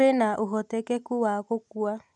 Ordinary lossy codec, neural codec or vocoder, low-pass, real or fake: none; none; 10.8 kHz; real